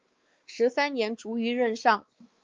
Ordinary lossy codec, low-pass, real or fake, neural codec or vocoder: Opus, 32 kbps; 7.2 kHz; fake; codec, 16 kHz, 4 kbps, X-Codec, WavLM features, trained on Multilingual LibriSpeech